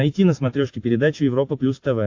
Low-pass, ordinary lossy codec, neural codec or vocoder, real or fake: 7.2 kHz; AAC, 48 kbps; none; real